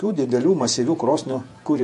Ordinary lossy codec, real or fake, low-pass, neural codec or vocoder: MP3, 48 kbps; fake; 14.4 kHz; vocoder, 48 kHz, 128 mel bands, Vocos